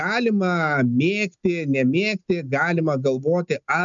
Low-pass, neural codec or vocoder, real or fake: 7.2 kHz; none; real